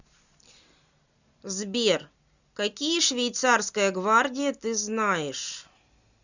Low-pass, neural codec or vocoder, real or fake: 7.2 kHz; none; real